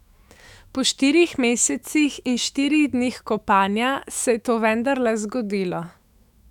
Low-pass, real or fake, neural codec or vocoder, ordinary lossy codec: 19.8 kHz; fake; autoencoder, 48 kHz, 128 numbers a frame, DAC-VAE, trained on Japanese speech; none